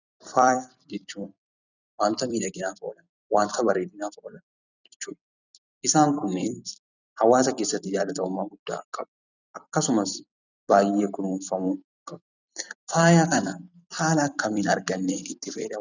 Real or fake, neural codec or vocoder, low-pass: fake; vocoder, 44.1 kHz, 128 mel bands every 256 samples, BigVGAN v2; 7.2 kHz